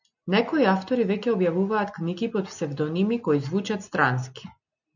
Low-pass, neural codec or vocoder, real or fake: 7.2 kHz; none; real